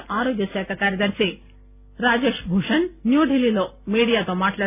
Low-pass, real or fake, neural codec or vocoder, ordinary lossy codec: 3.6 kHz; real; none; none